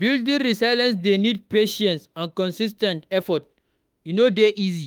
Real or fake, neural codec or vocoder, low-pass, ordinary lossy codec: fake; autoencoder, 48 kHz, 32 numbers a frame, DAC-VAE, trained on Japanese speech; 19.8 kHz; Opus, 32 kbps